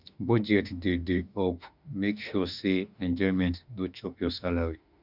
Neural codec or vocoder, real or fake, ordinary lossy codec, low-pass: autoencoder, 48 kHz, 32 numbers a frame, DAC-VAE, trained on Japanese speech; fake; none; 5.4 kHz